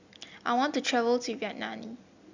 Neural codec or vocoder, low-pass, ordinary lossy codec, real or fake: none; 7.2 kHz; Opus, 64 kbps; real